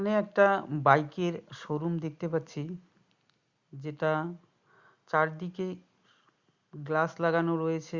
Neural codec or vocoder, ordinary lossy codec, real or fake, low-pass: none; Opus, 64 kbps; real; 7.2 kHz